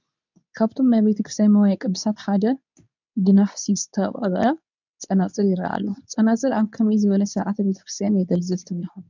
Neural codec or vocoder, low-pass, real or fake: codec, 24 kHz, 0.9 kbps, WavTokenizer, medium speech release version 2; 7.2 kHz; fake